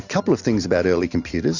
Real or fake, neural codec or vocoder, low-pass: real; none; 7.2 kHz